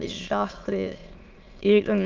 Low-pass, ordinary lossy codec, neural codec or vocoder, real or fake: 7.2 kHz; Opus, 32 kbps; autoencoder, 22.05 kHz, a latent of 192 numbers a frame, VITS, trained on many speakers; fake